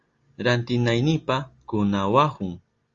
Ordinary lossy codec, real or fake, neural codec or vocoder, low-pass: Opus, 32 kbps; real; none; 7.2 kHz